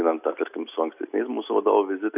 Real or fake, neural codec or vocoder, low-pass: real; none; 3.6 kHz